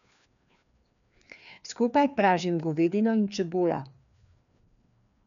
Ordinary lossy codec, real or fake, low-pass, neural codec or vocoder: none; fake; 7.2 kHz; codec, 16 kHz, 2 kbps, FreqCodec, larger model